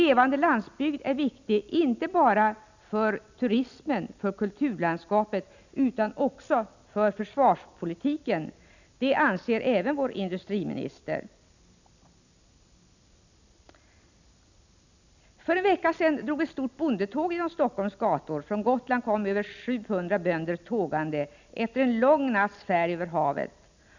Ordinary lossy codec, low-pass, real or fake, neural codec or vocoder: none; 7.2 kHz; real; none